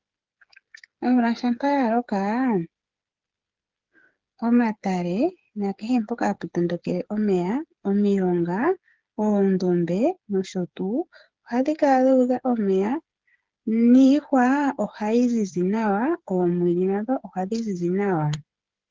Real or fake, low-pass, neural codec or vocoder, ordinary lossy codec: fake; 7.2 kHz; codec, 16 kHz, 8 kbps, FreqCodec, smaller model; Opus, 16 kbps